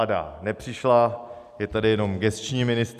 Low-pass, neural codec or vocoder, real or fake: 14.4 kHz; none; real